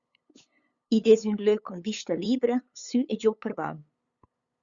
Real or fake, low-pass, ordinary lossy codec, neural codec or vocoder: fake; 7.2 kHz; Opus, 64 kbps; codec, 16 kHz, 8 kbps, FunCodec, trained on LibriTTS, 25 frames a second